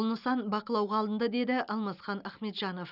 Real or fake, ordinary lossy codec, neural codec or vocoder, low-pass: real; none; none; 5.4 kHz